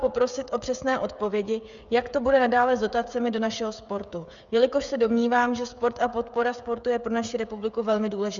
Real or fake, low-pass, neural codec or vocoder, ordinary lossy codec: fake; 7.2 kHz; codec, 16 kHz, 16 kbps, FreqCodec, smaller model; Opus, 64 kbps